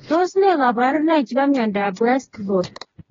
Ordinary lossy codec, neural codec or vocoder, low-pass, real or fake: AAC, 24 kbps; codec, 16 kHz, 2 kbps, FreqCodec, smaller model; 7.2 kHz; fake